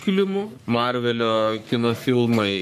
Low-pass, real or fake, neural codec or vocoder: 14.4 kHz; fake; codec, 44.1 kHz, 3.4 kbps, Pupu-Codec